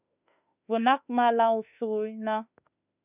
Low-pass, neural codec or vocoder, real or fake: 3.6 kHz; autoencoder, 48 kHz, 32 numbers a frame, DAC-VAE, trained on Japanese speech; fake